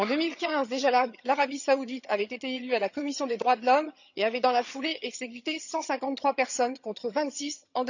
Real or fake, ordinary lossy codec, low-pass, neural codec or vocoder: fake; none; 7.2 kHz; vocoder, 22.05 kHz, 80 mel bands, HiFi-GAN